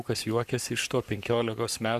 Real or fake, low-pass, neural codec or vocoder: fake; 14.4 kHz; codec, 44.1 kHz, 7.8 kbps, Pupu-Codec